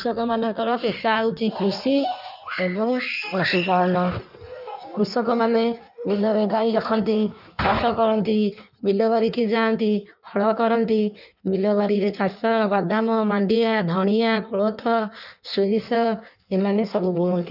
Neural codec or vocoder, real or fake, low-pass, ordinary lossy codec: codec, 16 kHz in and 24 kHz out, 1.1 kbps, FireRedTTS-2 codec; fake; 5.4 kHz; none